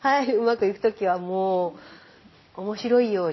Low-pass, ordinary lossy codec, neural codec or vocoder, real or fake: 7.2 kHz; MP3, 24 kbps; none; real